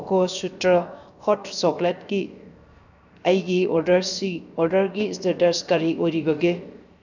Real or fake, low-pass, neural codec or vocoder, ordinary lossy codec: fake; 7.2 kHz; codec, 16 kHz, 0.7 kbps, FocalCodec; none